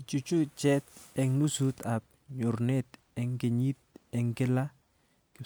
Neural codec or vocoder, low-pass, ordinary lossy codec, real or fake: none; none; none; real